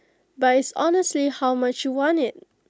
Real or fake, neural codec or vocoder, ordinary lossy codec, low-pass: real; none; none; none